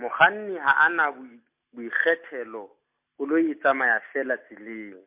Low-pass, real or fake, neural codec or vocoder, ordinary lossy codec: 3.6 kHz; real; none; MP3, 32 kbps